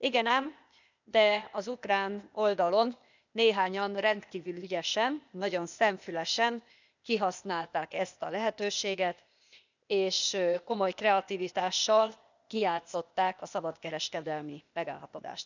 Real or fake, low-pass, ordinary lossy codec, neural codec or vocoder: fake; 7.2 kHz; none; codec, 16 kHz, 0.8 kbps, ZipCodec